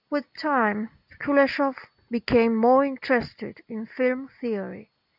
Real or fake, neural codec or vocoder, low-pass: real; none; 5.4 kHz